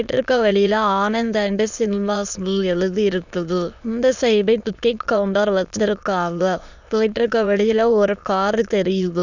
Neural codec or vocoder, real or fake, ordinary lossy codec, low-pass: autoencoder, 22.05 kHz, a latent of 192 numbers a frame, VITS, trained on many speakers; fake; none; 7.2 kHz